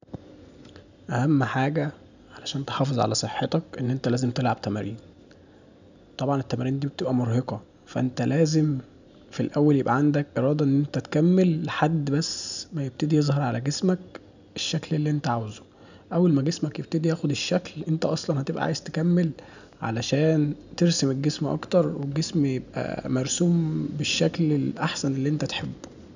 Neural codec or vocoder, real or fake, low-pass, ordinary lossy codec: none; real; 7.2 kHz; none